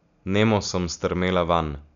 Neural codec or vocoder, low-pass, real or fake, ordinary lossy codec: none; 7.2 kHz; real; none